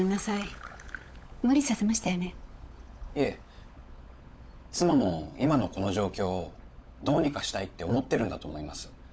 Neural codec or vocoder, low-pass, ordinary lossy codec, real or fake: codec, 16 kHz, 16 kbps, FunCodec, trained on LibriTTS, 50 frames a second; none; none; fake